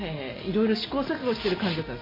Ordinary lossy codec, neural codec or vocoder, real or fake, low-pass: MP3, 24 kbps; none; real; 5.4 kHz